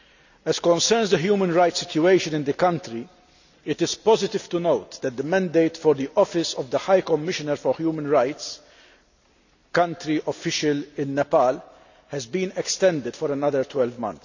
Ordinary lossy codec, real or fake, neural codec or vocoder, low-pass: none; real; none; 7.2 kHz